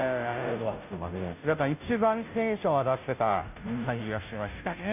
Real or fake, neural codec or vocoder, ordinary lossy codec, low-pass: fake; codec, 16 kHz, 0.5 kbps, FunCodec, trained on Chinese and English, 25 frames a second; none; 3.6 kHz